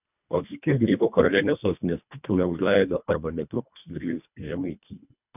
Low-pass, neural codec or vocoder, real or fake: 3.6 kHz; codec, 24 kHz, 1.5 kbps, HILCodec; fake